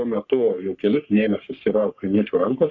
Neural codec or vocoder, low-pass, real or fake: codec, 44.1 kHz, 3.4 kbps, Pupu-Codec; 7.2 kHz; fake